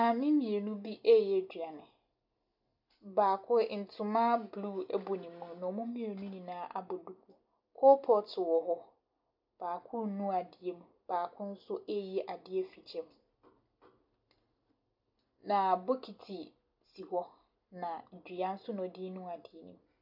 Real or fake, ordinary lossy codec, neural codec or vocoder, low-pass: real; MP3, 48 kbps; none; 5.4 kHz